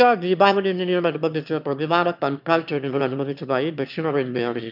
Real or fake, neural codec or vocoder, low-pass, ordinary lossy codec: fake; autoencoder, 22.05 kHz, a latent of 192 numbers a frame, VITS, trained on one speaker; 5.4 kHz; none